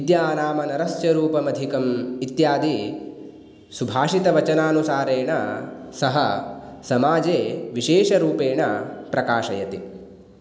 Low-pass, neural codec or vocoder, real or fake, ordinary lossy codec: none; none; real; none